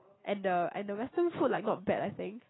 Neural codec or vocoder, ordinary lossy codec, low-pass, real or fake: none; AAC, 16 kbps; 7.2 kHz; real